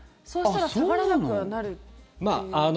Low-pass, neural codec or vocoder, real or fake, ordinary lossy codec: none; none; real; none